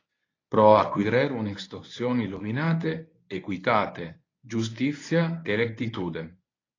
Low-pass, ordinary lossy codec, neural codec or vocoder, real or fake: 7.2 kHz; AAC, 32 kbps; codec, 24 kHz, 0.9 kbps, WavTokenizer, medium speech release version 1; fake